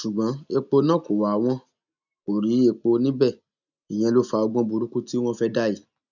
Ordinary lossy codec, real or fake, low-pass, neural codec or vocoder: none; real; 7.2 kHz; none